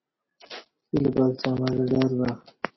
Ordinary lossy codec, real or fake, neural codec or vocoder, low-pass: MP3, 24 kbps; real; none; 7.2 kHz